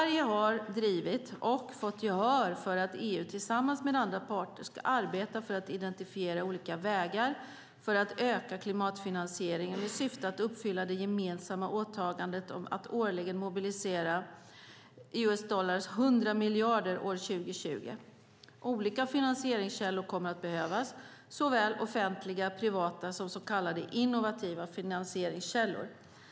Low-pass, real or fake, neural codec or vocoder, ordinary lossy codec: none; real; none; none